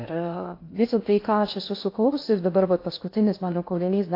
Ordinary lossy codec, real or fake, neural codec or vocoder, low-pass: AAC, 32 kbps; fake; codec, 16 kHz in and 24 kHz out, 0.6 kbps, FocalCodec, streaming, 2048 codes; 5.4 kHz